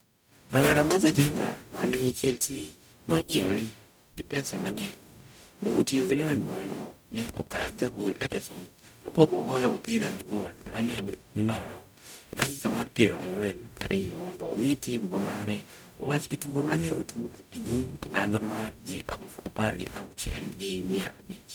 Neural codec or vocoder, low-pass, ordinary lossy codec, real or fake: codec, 44.1 kHz, 0.9 kbps, DAC; none; none; fake